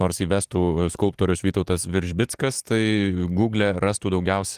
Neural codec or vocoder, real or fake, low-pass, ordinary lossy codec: codec, 44.1 kHz, 7.8 kbps, DAC; fake; 14.4 kHz; Opus, 24 kbps